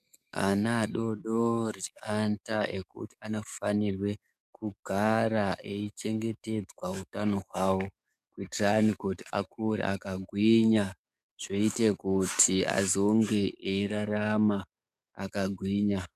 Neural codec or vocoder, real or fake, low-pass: codec, 44.1 kHz, 7.8 kbps, DAC; fake; 14.4 kHz